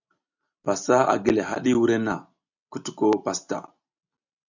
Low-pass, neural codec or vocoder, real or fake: 7.2 kHz; vocoder, 44.1 kHz, 128 mel bands every 256 samples, BigVGAN v2; fake